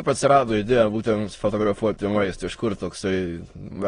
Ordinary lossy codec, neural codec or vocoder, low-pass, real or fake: AAC, 32 kbps; autoencoder, 22.05 kHz, a latent of 192 numbers a frame, VITS, trained on many speakers; 9.9 kHz; fake